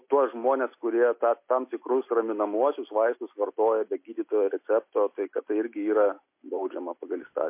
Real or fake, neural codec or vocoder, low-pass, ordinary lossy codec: real; none; 3.6 kHz; MP3, 24 kbps